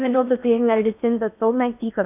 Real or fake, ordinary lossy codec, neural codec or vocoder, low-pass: fake; none; codec, 16 kHz in and 24 kHz out, 0.6 kbps, FocalCodec, streaming, 4096 codes; 3.6 kHz